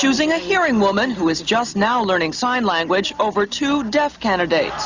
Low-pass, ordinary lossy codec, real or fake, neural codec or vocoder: 7.2 kHz; Opus, 64 kbps; real; none